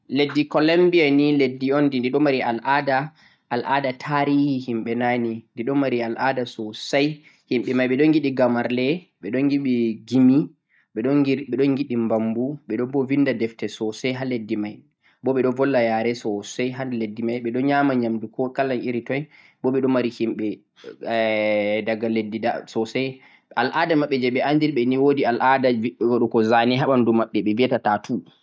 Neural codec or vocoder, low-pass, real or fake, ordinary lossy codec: none; none; real; none